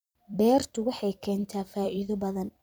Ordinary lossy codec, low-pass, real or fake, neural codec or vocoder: none; none; fake; vocoder, 44.1 kHz, 128 mel bands every 256 samples, BigVGAN v2